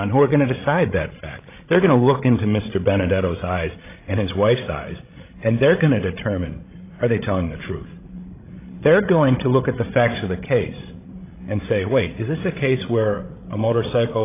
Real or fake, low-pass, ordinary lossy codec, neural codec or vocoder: fake; 3.6 kHz; AAC, 24 kbps; codec, 16 kHz, 16 kbps, FreqCodec, larger model